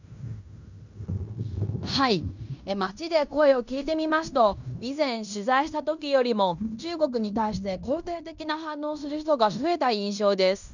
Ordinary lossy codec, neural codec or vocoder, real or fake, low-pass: none; codec, 16 kHz in and 24 kHz out, 0.9 kbps, LongCat-Audio-Codec, fine tuned four codebook decoder; fake; 7.2 kHz